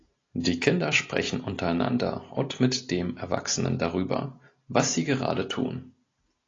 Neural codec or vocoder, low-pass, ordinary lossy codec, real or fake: none; 7.2 kHz; AAC, 32 kbps; real